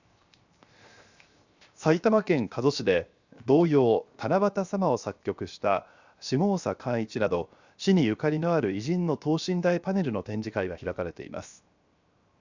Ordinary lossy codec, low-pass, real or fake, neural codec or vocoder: Opus, 64 kbps; 7.2 kHz; fake; codec, 16 kHz, 0.7 kbps, FocalCodec